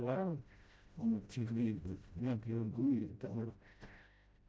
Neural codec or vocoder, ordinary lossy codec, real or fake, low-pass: codec, 16 kHz, 0.5 kbps, FreqCodec, smaller model; none; fake; none